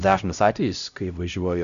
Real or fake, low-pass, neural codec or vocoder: fake; 7.2 kHz; codec, 16 kHz, 0.5 kbps, X-Codec, HuBERT features, trained on LibriSpeech